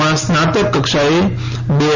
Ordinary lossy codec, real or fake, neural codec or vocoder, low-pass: none; real; none; 7.2 kHz